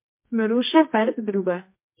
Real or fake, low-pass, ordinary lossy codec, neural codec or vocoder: fake; 3.6 kHz; MP3, 32 kbps; codec, 24 kHz, 0.9 kbps, WavTokenizer, medium music audio release